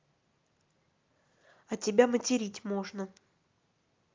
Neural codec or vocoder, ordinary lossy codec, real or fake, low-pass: none; Opus, 24 kbps; real; 7.2 kHz